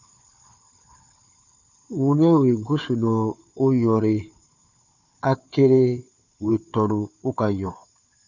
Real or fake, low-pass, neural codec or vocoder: fake; 7.2 kHz; codec, 16 kHz, 4 kbps, FunCodec, trained on Chinese and English, 50 frames a second